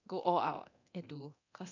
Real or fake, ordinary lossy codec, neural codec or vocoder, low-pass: fake; none; codec, 24 kHz, 0.9 kbps, DualCodec; 7.2 kHz